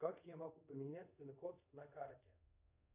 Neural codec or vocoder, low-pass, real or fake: codec, 24 kHz, 0.5 kbps, DualCodec; 3.6 kHz; fake